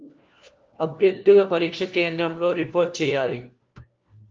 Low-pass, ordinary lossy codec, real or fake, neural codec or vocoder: 7.2 kHz; Opus, 24 kbps; fake; codec, 16 kHz, 1 kbps, FunCodec, trained on LibriTTS, 50 frames a second